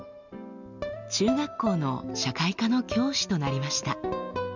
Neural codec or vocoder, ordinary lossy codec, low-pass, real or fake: none; none; 7.2 kHz; real